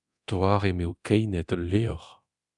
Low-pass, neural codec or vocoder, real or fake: 10.8 kHz; codec, 24 kHz, 0.9 kbps, DualCodec; fake